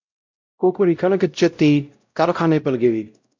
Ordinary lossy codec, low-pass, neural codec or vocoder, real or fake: MP3, 64 kbps; 7.2 kHz; codec, 16 kHz, 0.5 kbps, X-Codec, WavLM features, trained on Multilingual LibriSpeech; fake